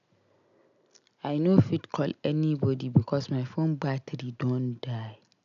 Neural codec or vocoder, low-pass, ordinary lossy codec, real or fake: none; 7.2 kHz; none; real